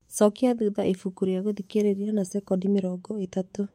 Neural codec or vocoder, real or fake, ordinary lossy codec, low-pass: autoencoder, 48 kHz, 128 numbers a frame, DAC-VAE, trained on Japanese speech; fake; MP3, 64 kbps; 19.8 kHz